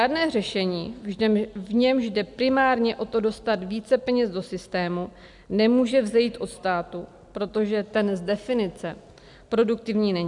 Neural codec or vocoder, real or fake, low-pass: none; real; 10.8 kHz